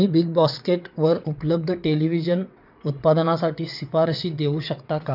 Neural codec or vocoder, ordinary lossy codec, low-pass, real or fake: vocoder, 22.05 kHz, 80 mel bands, WaveNeXt; none; 5.4 kHz; fake